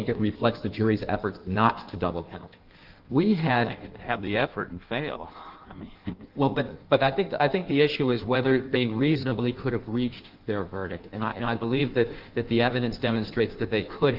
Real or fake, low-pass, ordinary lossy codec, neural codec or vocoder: fake; 5.4 kHz; Opus, 32 kbps; codec, 16 kHz in and 24 kHz out, 1.1 kbps, FireRedTTS-2 codec